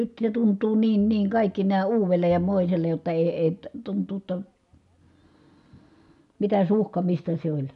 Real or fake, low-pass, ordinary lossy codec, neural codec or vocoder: real; 10.8 kHz; none; none